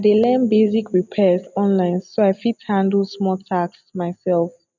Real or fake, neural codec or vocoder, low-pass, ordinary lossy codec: real; none; 7.2 kHz; none